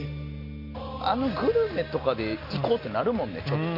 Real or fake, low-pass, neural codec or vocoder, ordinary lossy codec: real; 5.4 kHz; none; MP3, 32 kbps